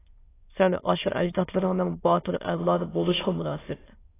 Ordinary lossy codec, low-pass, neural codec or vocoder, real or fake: AAC, 16 kbps; 3.6 kHz; autoencoder, 22.05 kHz, a latent of 192 numbers a frame, VITS, trained on many speakers; fake